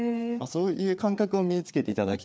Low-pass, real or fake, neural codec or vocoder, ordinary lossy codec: none; fake; codec, 16 kHz, 4 kbps, FreqCodec, larger model; none